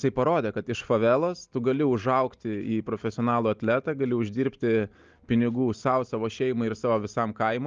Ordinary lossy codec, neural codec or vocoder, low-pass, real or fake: Opus, 24 kbps; none; 7.2 kHz; real